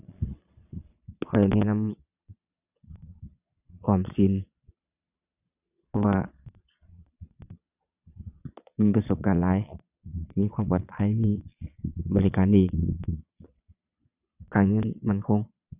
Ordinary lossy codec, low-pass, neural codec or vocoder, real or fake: none; 3.6 kHz; vocoder, 22.05 kHz, 80 mel bands, Vocos; fake